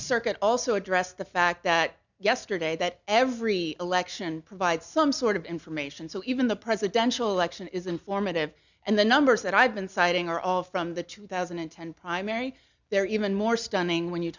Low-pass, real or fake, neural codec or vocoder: 7.2 kHz; real; none